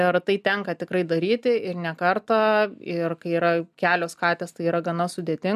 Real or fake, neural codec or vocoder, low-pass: real; none; 14.4 kHz